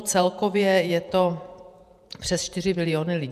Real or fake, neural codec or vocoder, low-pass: fake; vocoder, 48 kHz, 128 mel bands, Vocos; 14.4 kHz